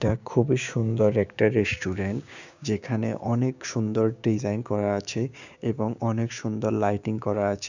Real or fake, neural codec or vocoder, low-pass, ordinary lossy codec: fake; codec, 16 kHz, 6 kbps, DAC; 7.2 kHz; none